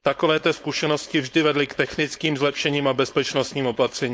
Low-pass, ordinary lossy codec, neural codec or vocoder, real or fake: none; none; codec, 16 kHz, 4.8 kbps, FACodec; fake